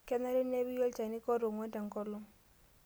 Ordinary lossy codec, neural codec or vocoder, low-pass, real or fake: none; none; none; real